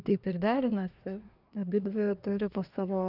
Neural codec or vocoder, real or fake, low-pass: codec, 24 kHz, 1 kbps, SNAC; fake; 5.4 kHz